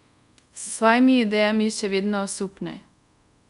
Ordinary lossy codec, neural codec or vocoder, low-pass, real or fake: none; codec, 24 kHz, 0.5 kbps, DualCodec; 10.8 kHz; fake